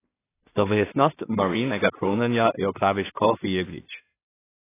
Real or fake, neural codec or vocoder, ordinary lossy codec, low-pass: fake; codec, 16 kHz in and 24 kHz out, 0.4 kbps, LongCat-Audio-Codec, two codebook decoder; AAC, 16 kbps; 3.6 kHz